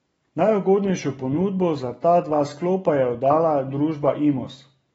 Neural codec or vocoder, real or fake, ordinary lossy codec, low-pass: none; real; AAC, 24 kbps; 19.8 kHz